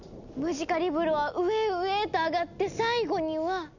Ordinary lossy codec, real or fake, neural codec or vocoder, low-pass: none; real; none; 7.2 kHz